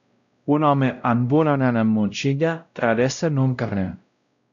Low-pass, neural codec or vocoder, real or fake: 7.2 kHz; codec, 16 kHz, 0.5 kbps, X-Codec, WavLM features, trained on Multilingual LibriSpeech; fake